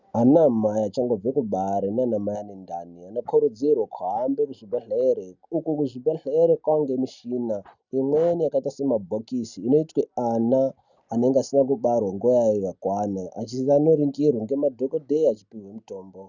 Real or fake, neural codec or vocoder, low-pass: real; none; 7.2 kHz